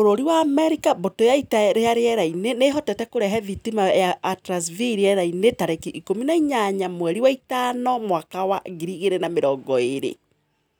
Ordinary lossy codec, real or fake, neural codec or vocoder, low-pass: none; real; none; none